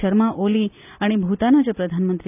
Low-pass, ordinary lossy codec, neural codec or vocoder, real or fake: 3.6 kHz; none; none; real